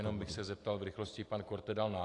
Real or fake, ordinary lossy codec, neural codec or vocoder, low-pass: real; AAC, 48 kbps; none; 10.8 kHz